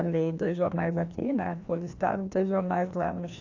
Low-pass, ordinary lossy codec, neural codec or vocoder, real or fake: 7.2 kHz; AAC, 48 kbps; codec, 16 kHz, 1 kbps, FreqCodec, larger model; fake